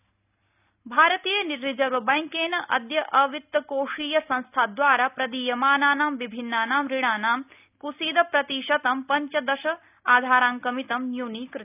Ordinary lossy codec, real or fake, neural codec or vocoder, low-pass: none; real; none; 3.6 kHz